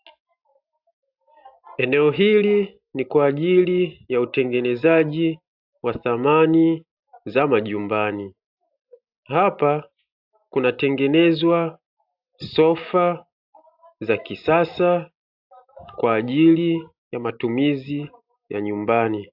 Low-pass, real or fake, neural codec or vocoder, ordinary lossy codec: 5.4 kHz; real; none; AAC, 48 kbps